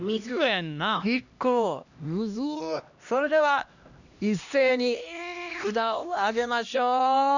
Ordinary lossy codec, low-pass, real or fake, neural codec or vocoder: none; 7.2 kHz; fake; codec, 16 kHz, 1 kbps, X-Codec, HuBERT features, trained on LibriSpeech